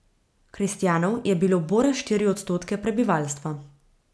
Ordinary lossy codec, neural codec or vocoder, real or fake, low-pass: none; none; real; none